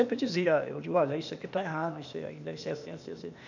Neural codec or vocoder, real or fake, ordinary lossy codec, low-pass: codec, 16 kHz, 0.8 kbps, ZipCodec; fake; none; 7.2 kHz